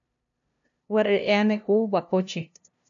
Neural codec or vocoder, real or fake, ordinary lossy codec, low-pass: codec, 16 kHz, 0.5 kbps, FunCodec, trained on LibriTTS, 25 frames a second; fake; MP3, 96 kbps; 7.2 kHz